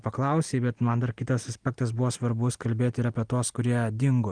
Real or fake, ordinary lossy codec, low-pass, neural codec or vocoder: real; Opus, 24 kbps; 9.9 kHz; none